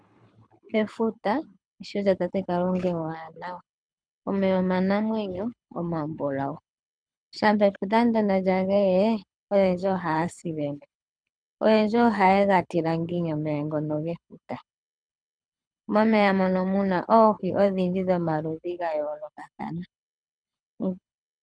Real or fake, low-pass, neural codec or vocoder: fake; 9.9 kHz; codec, 24 kHz, 6 kbps, HILCodec